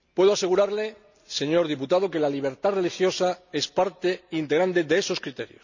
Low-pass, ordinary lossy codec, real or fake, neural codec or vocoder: 7.2 kHz; none; real; none